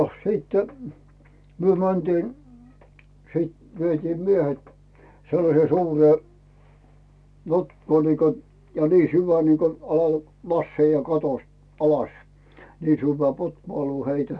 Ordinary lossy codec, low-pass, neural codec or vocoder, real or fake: none; none; none; real